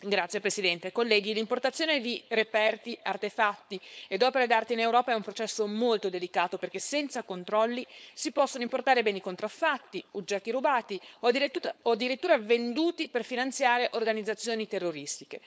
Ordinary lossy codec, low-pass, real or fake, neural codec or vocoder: none; none; fake; codec, 16 kHz, 4.8 kbps, FACodec